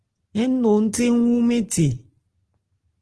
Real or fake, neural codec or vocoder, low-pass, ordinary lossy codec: real; none; 10.8 kHz; Opus, 16 kbps